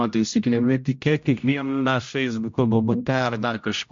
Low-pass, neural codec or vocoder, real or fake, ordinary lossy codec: 7.2 kHz; codec, 16 kHz, 0.5 kbps, X-Codec, HuBERT features, trained on general audio; fake; MP3, 48 kbps